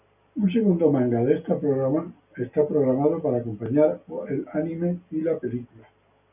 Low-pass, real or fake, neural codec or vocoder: 3.6 kHz; real; none